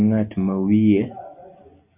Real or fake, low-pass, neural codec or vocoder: real; 3.6 kHz; none